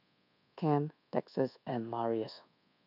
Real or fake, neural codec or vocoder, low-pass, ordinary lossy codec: fake; codec, 24 kHz, 1.2 kbps, DualCodec; 5.4 kHz; none